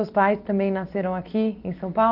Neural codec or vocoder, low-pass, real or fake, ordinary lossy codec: none; 5.4 kHz; real; Opus, 32 kbps